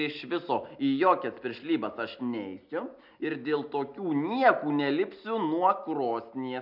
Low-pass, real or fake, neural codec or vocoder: 5.4 kHz; real; none